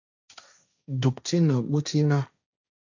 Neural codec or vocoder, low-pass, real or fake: codec, 16 kHz, 1.1 kbps, Voila-Tokenizer; 7.2 kHz; fake